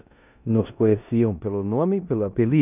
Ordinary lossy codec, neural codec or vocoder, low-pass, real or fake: none; codec, 16 kHz in and 24 kHz out, 0.9 kbps, LongCat-Audio-Codec, four codebook decoder; 3.6 kHz; fake